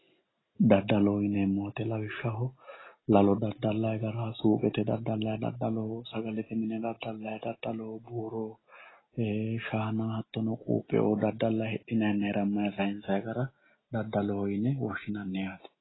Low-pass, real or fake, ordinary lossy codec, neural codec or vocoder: 7.2 kHz; real; AAC, 16 kbps; none